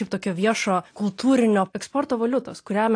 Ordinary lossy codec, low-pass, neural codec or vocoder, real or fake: AAC, 48 kbps; 9.9 kHz; none; real